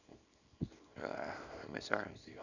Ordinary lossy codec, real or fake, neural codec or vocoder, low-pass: none; fake; codec, 24 kHz, 0.9 kbps, WavTokenizer, small release; 7.2 kHz